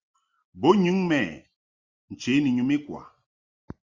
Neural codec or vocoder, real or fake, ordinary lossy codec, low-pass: none; real; Opus, 32 kbps; 7.2 kHz